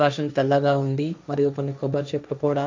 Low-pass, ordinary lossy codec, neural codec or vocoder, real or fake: 7.2 kHz; MP3, 64 kbps; codec, 16 kHz, 1.1 kbps, Voila-Tokenizer; fake